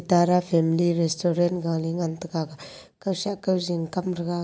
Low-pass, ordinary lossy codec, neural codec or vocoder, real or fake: none; none; none; real